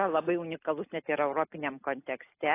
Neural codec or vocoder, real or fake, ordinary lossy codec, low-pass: none; real; AAC, 24 kbps; 3.6 kHz